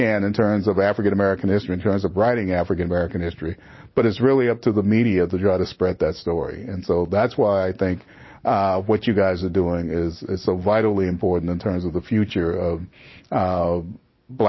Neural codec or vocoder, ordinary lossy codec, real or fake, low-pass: none; MP3, 24 kbps; real; 7.2 kHz